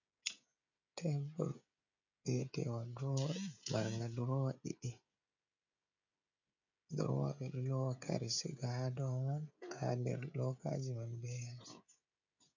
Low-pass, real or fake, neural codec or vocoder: 7.2 kHz; fake; codec, 16 kHz, 16 kbps, FreqCodec, smaller model